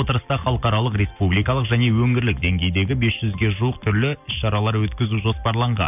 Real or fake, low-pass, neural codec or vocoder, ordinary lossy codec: real; 3.6 kHz; none; none